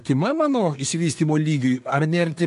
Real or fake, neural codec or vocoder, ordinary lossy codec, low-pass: fake; codec, 24 kHz, 1 kbps, SNAC; AAC, 64 kbps; 10.8 kHz